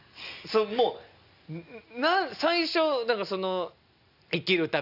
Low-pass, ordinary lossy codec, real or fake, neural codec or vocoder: 5.4 kHz; none; real; none